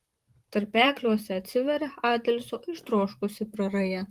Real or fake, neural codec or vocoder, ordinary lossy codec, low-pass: fake; vocoder, 44.1 kHz, 128 mel bands, Pupu-Vocoder; Opus, 24 kbps; 14.4 kHz